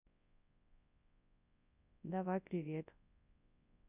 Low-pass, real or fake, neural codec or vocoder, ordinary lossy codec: 3.6 kHz; fake; codec, 24 kHz, 0.9 kbps, WavTokenizer, large speech release; none